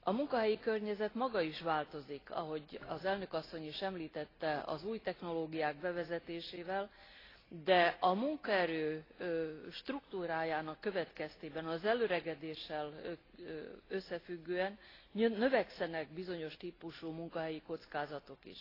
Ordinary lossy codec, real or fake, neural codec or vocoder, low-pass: AAC, 24 kbps; real; none; 5.4 kHz